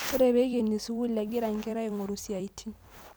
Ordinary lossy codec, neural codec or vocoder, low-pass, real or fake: none; vocoder, 44.1 kHz, 128 mel bands every 256 samples, BigVGAN v2; none; fake